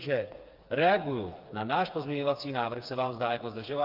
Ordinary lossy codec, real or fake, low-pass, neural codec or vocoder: Opus, 24 kbps; fake; 5.4 kHz; codec, 16 kHz, 4 kbps, FreqCodec, smaller model